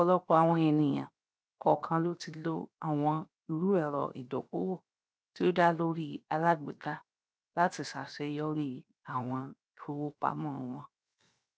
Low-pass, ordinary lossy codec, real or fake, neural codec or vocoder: none; none; fake; codec, 16 kHz, 0.7 kbps, FocalCodec